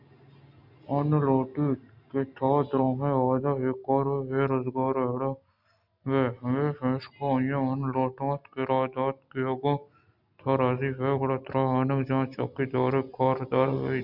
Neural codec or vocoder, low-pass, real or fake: none; 5.4 kHz; real